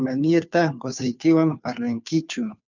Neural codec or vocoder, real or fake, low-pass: codec, 16 kHz, 2 kbps, FunCodec, trained on Chinese and English, 25 frames a second; fake; 7.2 kHz